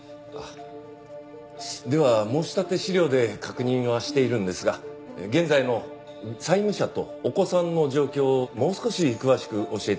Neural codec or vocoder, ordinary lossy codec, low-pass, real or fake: none; none; none; real